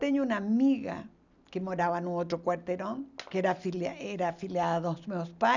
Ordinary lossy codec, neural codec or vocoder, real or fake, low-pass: none; none; real; 7.2 kHz